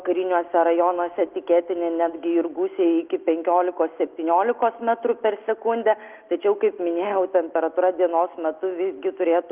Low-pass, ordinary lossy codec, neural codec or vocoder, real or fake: 3.6 kHz; Opus, 32 kbps; none; real